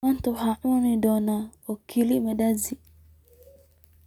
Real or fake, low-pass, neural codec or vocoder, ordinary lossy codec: real; 19.8 kHz; none; none